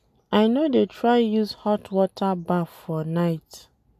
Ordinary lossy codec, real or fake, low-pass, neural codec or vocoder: MP3, 96 kbps; real; 14.4 kHz; none